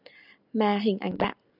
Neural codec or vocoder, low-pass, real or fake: none; 5.4 kHz; real